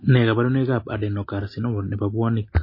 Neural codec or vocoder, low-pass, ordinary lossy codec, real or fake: none; 5.4 kHz; MP3, 24 kbps; real